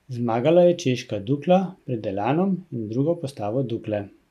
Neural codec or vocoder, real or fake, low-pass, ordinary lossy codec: none; real; 14.4 kHz; none